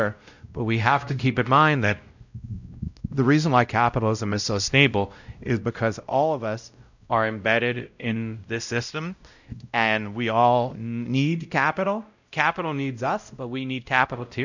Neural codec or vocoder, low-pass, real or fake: codec, 16 kHz, 0.5 kbps, X-Codec, WavLM features, trained on Multilingual LibriSpeech; 7.2 kHz; fake